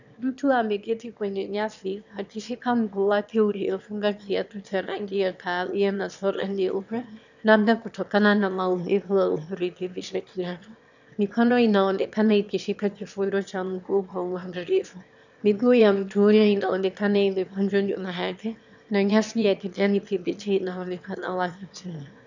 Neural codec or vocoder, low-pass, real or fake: autoencoder, 22.05 kHz, a latent of 192 numbers a frame, VITS, trained on one speaker; 7.2 kHz; fake